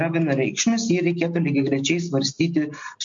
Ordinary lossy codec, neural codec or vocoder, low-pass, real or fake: MP3, 48 kbps; none; 7.2 kHz; real